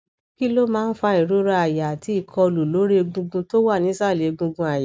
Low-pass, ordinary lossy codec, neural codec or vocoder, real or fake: none; none; none; real